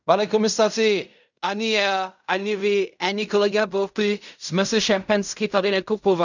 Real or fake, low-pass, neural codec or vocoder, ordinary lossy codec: fake; 7.2 kHz; codec, 16 kHz in and 24 kHz out, 0.4 kbps, LongCat-Audio-Codec, fine tuned four codebook decoder; none